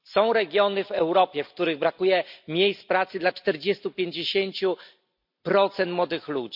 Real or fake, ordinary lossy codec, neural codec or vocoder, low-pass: real; MP3, 48 kbps; none; 5.4 kHz